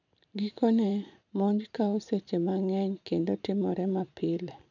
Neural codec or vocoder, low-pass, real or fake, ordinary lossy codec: vocoder, 44.1 kHz, 128 mel bands every 512 samples, BigVGAN v2; 7.2 kHz; fake; none